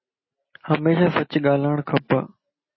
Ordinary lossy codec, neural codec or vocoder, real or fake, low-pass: MP3, 24 kbps; none; real; 7.2 kHz